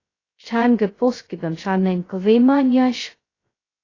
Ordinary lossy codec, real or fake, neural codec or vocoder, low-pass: AAC, 32 kbps; fake; codec, 16 kHz, 0.2 kbps, FocalCodec; 7.2 kHz